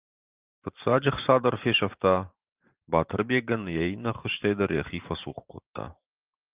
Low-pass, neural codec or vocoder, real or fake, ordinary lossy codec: 3.6 kHz; vocoder, 44.1 kHz, 128 mel bands every 512 samples, BigVGAN v2; fake; Opus, 32 kbps